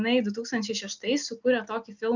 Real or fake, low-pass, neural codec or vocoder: real; 7.2 kHz; none